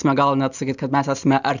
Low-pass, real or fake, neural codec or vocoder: 7.2 kHz; real; none